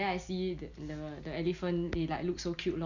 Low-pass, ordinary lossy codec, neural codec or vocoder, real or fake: 7.2 kHz; none; none; real